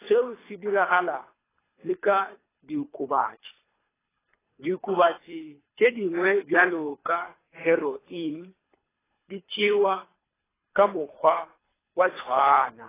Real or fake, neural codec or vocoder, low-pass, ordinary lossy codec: fake; codec, 24 kHz, 3 kbps, HILCodec; 3.6 kHz; AAC, 16 kbps